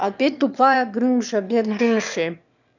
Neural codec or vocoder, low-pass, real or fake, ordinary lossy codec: autoencoder, 22.05 kHz, a latent of 192 numbers a frame, VITS, trained on one speaker; 7.2 kHz; fake; none